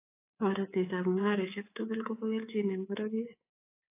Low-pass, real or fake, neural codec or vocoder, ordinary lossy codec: 3.6 kHz; fake; codec, 24 kHz, 3.1 kbps, DualCodec; AAC, 24 kbps